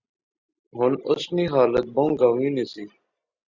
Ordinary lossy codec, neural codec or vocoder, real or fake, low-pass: Opus, 64 kbps; none; real; 7.2 kHz